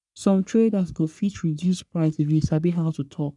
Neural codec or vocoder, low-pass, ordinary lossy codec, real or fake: codec, 44.1 kHz, 3.4 kbps, Pupu-Codec; 10.8 kHz; none; fake